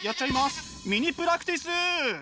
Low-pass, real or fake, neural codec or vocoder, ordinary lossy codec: none; real; none; none